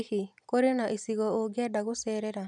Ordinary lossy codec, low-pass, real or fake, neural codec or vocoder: none; 10.8 kHz; real; none